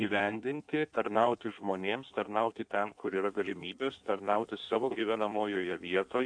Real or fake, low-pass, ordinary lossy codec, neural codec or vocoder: fake; 9.9 kHz; AAC, 64 kbps; codec, 16 kHz in and 24 kHz out, 1.1 kbps, FireRedTTS-2 codec